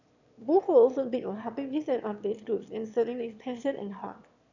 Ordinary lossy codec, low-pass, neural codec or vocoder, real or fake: none; 7.2 kHz; autoencoder, 22.05 kHz, a latent of 192 numbers a frame, VITS, trained on one speaker; fake